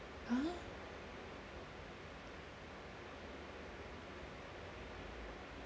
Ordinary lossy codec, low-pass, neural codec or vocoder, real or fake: none; none; none; real